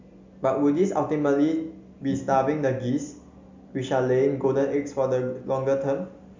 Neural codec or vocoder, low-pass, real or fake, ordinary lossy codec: none; 7.2 kHz; real; MP3, 64 kbps